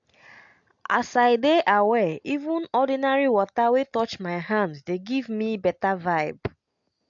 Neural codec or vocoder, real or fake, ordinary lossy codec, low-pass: none; real; AAC, 64 kbps; 7.2 kHz